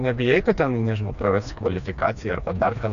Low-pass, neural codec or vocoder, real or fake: 7.2 kHz; codec, 16 kHz, 2 kbps, FreqCodec, smaller model; fake